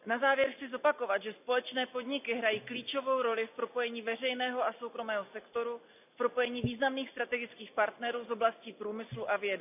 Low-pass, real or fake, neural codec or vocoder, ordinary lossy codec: 3.6 kHz; real; none; none